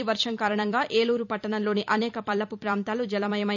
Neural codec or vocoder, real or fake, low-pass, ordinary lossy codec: vocoder, 44.1 kHz, 128 mel bands every 256 samples, BigVGAN v2; fake; 7.2 kHz; none